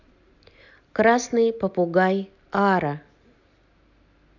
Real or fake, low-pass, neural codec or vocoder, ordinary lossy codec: real; 7.2 kHz; none; none